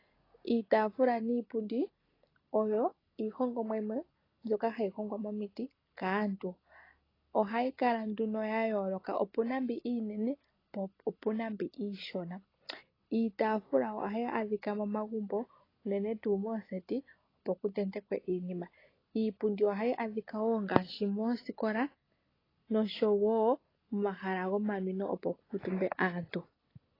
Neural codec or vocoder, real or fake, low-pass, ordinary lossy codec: none; real; 5.4 kHz; AAC, 24 kbps